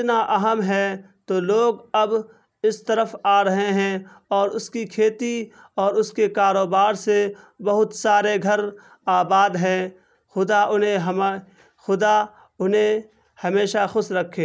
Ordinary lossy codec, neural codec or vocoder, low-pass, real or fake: none; none; none; real